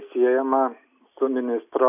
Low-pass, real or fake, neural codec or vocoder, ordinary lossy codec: 3.6 kHz; real; none; AAC, 32 kbps